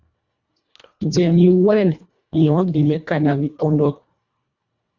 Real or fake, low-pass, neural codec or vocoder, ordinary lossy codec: fake; 7.2 kHz; codec, 24 kHz, 1.5 kbps, HILCodec; Opus, 64 kbps